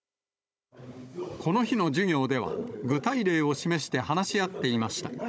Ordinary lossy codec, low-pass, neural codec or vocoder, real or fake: none; none; codec, 16 kHz, 16 kbps, FunCodec, trained on Chinese and English, 50 frames a second; fake